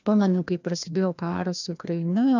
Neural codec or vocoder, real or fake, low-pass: codec, 16 kHz, 1 kbps, FreqCodec, larger model; fake; 7.2 kHz